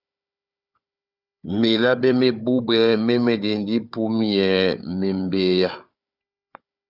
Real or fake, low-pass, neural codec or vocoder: fake; 5.4 kHz; codec, 16 kHz, 4 kbps, FunCodec, trained on Chinese and English, 50 frames a second